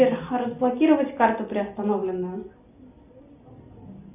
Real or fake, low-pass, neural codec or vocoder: real; 3.6 kHz; none